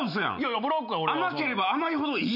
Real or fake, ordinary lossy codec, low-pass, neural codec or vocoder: real; none; 5.4 kHz; none